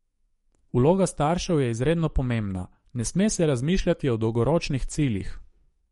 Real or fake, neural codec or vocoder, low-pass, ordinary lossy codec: fake; codec, 44.1 kHz, 7.8 kbps, DAC; 19.8 kHz; MP3, 48 kbps